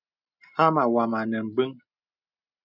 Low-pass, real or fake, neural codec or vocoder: 5.4 kHz; real; none